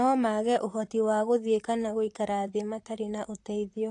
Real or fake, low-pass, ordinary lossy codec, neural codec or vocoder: fake; 10.8 kHz; AAC, 48 kbps; vocoder, 44.1 kHz, 128 mel bands, Pupu-Vocoder